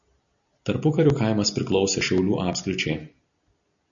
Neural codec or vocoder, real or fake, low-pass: none; real; 7.2 kHz